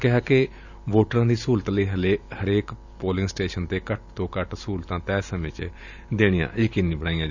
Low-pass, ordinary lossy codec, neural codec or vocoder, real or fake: 7.2 kHz; none; vocoder, 44.1 kHz, 128 mel bands every 512 samples, BigVGAN v2; fake